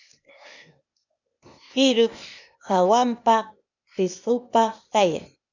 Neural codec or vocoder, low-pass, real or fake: codec, 16 kHz, 0.8 kbps, ZipCodec; 7.2 kHz; fake